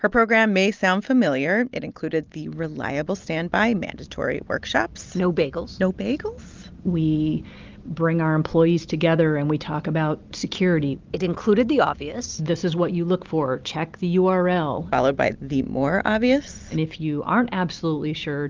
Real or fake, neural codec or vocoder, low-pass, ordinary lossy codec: real; none; 7.2 kHz; Opus, 32 kbps